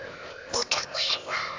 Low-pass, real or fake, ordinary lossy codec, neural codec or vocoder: 7.2 kHz; fake; AAC, 48 kbps; codec, 16 kHz, 0.8 kbps, ZipCodec